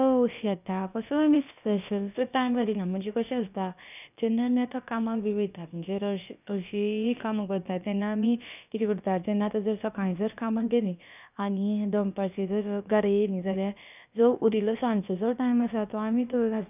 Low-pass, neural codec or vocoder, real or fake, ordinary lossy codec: 3.6 kHz; codec, 16 kHz, about 1 kbps, DyCAST, with the encoder's durations; fake; none